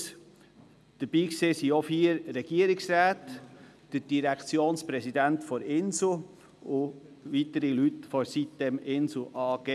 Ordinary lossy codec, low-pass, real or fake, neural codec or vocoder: none; none; real; none